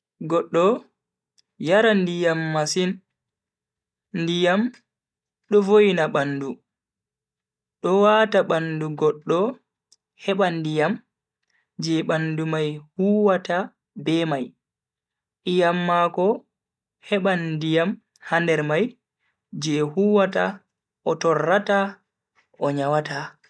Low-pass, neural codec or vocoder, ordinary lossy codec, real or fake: none; none; none; real